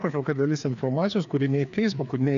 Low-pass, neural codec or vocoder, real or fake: 7.2 kHz; codec, 16 kHz, 2 kbps, FreqCodec, larger model; fake